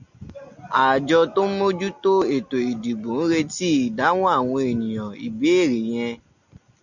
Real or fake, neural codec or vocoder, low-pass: real; none; 7.2 kHz